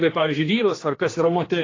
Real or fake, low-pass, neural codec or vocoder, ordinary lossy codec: fake; 7.2 kHz; codec, 16 kHz, 2 kbps, X-Codec, HuBERT features, trained on general audio; AAC, 32 kbps